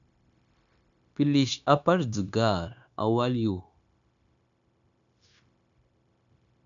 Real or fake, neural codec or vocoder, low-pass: fake; codec, 16 kHz, 0.9 kbps, LongCat-Audio-Codec; 7.2 kHz